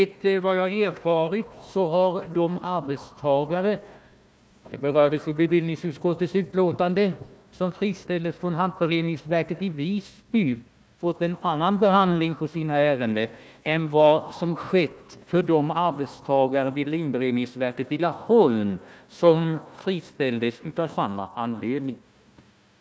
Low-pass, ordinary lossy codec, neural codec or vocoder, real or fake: none; none; codec, 16 kHz, 1 kbps, FunCodec, trained on Chinese and English, 50 frames a second; fake